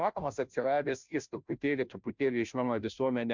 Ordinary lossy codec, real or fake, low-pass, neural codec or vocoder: MP3, 64 kbps; fake; 7.2 kHz; codec, 16 kHz, 0.5 kbps, FunCodec, trained on Chinese and English, 25 frames a second